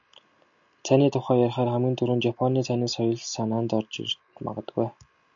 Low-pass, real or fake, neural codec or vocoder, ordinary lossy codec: 7.2 kHz; real; none; AAC, 64 kbps